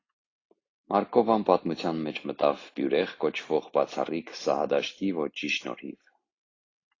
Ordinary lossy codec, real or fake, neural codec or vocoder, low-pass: AAC, 32 kbps; real; none; 7.2 kHz